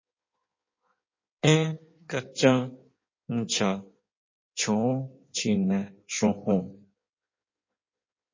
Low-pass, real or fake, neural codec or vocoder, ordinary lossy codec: 7.2 kHz; fake; codec, 16 kHz in and 24 kHz out, 1.1 kbps, FireRedTTS-2 codec; MP3, 32 kbps